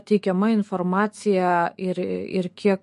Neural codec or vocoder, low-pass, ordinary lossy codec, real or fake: autoencoder, 48 kHz, 128 numbers a frame, DAC-VAE, trained on Japanese speech; 14.4 kHz; MP3, 48 kbps; fake